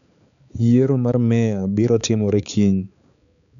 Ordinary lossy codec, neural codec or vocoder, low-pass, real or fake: MP3, 96 kbps; codec, 16 kHz, 4 kbps, X-Codec, HuBERT features, trained on balanced general audio; 7.2 kHz; fake